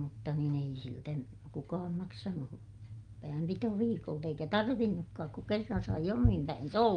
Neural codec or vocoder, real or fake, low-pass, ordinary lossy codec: none; real; 9.9 kHz; Opus, 32 kbps